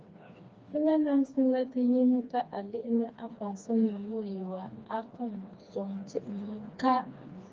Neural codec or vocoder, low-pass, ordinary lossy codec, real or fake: codec, 16 kHz, 2 kbps, FreqCodec, smaller model; 7.2 kHz; Opus, 24 kbps; fake